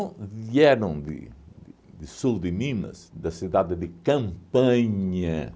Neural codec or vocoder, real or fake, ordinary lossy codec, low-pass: none; real; none; none